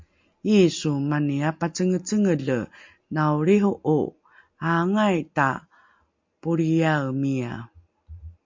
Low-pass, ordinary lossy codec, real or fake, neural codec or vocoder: 7.2 kHz; MP3, 48 kbps; real; none